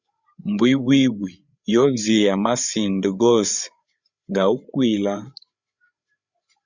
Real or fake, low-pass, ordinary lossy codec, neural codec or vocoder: fake; 7.2 kHz; Opus, 64 kbps; codec, 16 kHz, 8 kbps, FreqCodec, larger model